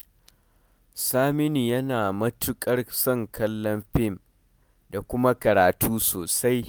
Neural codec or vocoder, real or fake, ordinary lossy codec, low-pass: none; real; none; none